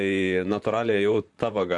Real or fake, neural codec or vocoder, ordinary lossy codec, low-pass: fake; vocoder, 44.1 kHz, 128 mel bands, Pupu-Vocoder; MP3, 64 kbps; 10.8 kHz